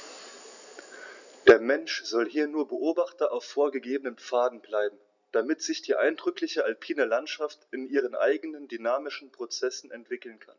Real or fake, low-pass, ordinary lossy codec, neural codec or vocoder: real; 7.2 kHz; none; none